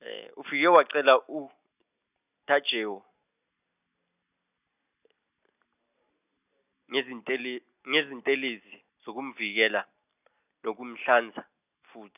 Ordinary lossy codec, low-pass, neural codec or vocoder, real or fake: none; 3.6 kHz; none; real